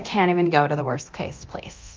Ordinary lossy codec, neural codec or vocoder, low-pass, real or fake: Opus, 24 kbps; codec, 24 kHz, 0.9 kbps, DualCodec; 7.2 kHz; fake